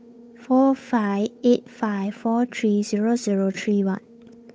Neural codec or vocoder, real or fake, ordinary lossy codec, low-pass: codec, 16 kHz, 8 kbps, FunCodec, trained on Chinese and English, 25 frames a second; fake; none; none